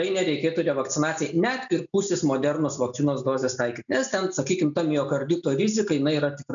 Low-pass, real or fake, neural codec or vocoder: 7.2 kHz; real; none